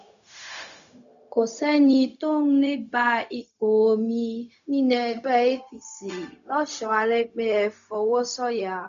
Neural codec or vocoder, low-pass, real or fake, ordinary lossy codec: codec, 16 kHz, 0.4 kbps, LongCat-Audio-Codec; 7.2 kHz; fake; none